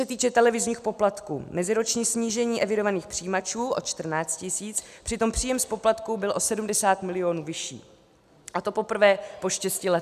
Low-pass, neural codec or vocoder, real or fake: 14.4 kHz; none; real